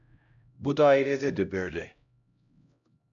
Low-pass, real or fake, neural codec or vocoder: 7.2 kHz; fake; codec, 16 kHz, 0.5 kbps, X-Codec, HuBERT features, trained on LibriSpeech